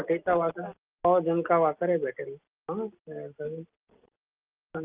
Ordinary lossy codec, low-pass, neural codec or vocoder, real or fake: Opus, 32 kbps; 3.6 kHz; none; real